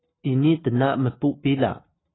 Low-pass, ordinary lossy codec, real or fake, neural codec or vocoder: 7.2 kHz; AAC, 16 kbps; real; none